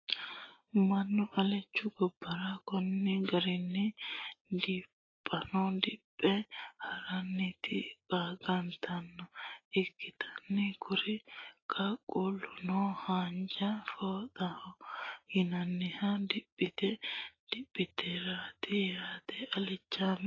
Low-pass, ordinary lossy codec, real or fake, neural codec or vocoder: 7.2 kHz; AAC, 32 kbps; real; none